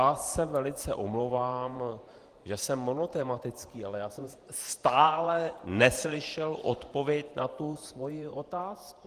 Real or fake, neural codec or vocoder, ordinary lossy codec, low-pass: fake; vocoder, 48 kHz, 128 mel bands, Vocos; Opus, 24 kbps; 14.4 kHz